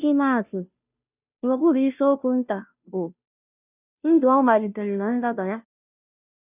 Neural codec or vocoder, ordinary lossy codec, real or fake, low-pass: codec, 16 kHz, 0.5 kbps, FunCodec, trained on Chinese and English, 25 frames a second; none; fake; 3.6 kHz